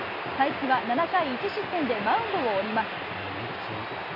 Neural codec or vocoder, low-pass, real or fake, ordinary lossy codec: none; 5.4 kHz; real; none